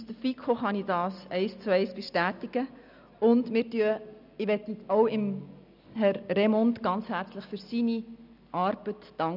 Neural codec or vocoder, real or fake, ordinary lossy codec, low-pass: none; real; none; 5.4 kHz